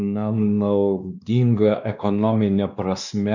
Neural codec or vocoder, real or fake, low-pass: codec, 16 kHz, 2 kbps, X-Codec, WavLM features, trained on Multilingual LibriSpeech; fake; 7.2 kHz